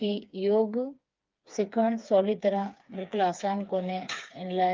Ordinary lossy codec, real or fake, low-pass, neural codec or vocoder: Opus, 32 kbps; fake; 7.2 kHz; codec, 16 kHz, 4 kbps, FreqCodec, smaller model